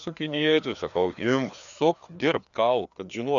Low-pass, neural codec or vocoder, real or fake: 7.2 kHz; codec, 16 kHz, 2 kbps, FreqCodec, larger model; fake